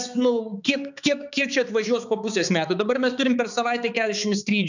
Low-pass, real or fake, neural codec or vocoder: 7.2 kHz; fake; codec, 16 kHz, 4 kbps, X-Codec, HuBERT features, trained on balanced general audio